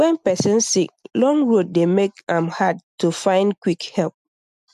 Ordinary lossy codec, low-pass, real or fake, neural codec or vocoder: none; 14.4 kHz; real; none